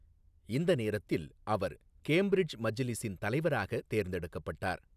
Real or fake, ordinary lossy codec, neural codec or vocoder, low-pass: real; none; none; 14.4 kHz